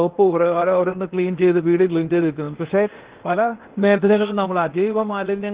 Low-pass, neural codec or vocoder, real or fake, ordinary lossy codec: 3.6 kHz; codec, 16 kHz, 0.8 kbps, ZipCodec; fake; Opus, 16 kbps